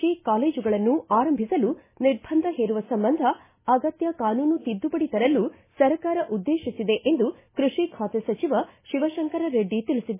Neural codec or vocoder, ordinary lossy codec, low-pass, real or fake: none; MP3, 16 kbps; 3.6 kHz; real